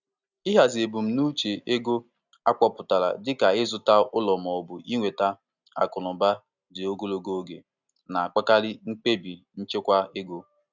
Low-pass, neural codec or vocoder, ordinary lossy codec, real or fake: 7.2 kHz; none; none; real